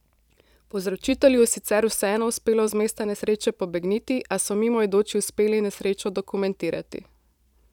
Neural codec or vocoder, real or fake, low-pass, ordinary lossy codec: vocoder, 44.1 kHz, 128 mel bands every 512 samples, BigVGAN v2; fake; 19.8 kHz; none